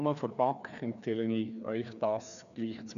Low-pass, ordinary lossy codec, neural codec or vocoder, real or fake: 7.2 kHz; none; codec, 16 kHz, 2 kbps, FreqCodec, larger model; fake